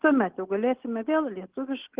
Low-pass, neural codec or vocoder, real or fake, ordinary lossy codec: 3.6 kHz; none; real; Opus, 16 kbps